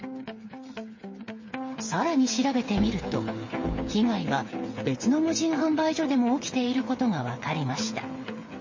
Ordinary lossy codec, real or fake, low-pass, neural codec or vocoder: MP3, 32 kbps; fake; 7.2 kHz; codec, 16 kHz, 8 kbps, FreqCodec, smaller model